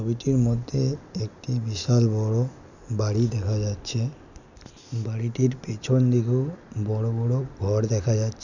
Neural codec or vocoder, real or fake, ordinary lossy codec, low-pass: none; real; none; 7.2 kHz